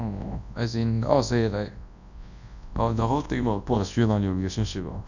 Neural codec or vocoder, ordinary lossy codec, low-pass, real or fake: codec, 24 kHz, 0.9 kbps, WavTokenizer, large speech release; none; 7.2 kHz; fake